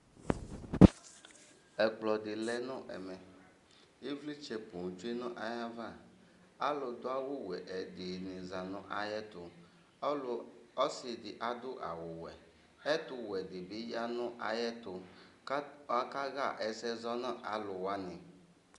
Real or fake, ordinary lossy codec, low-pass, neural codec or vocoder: real; Opus, 64 kbps; 10.8 kHz; none